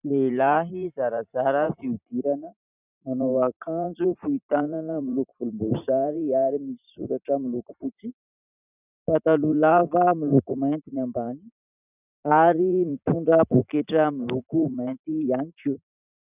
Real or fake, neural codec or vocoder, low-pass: fake; vocoder, 44.1 kHz, 128 mel bands every 512 samples, BigVGAN v2; 3.6 kHz